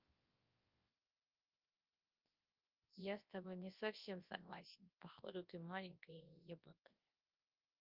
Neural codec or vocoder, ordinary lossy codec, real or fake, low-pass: codec, 24 kHz, 0.9 kbps, WavTokenizer, large speech release; Opus, 24 kbps; fake; 5.4 kHz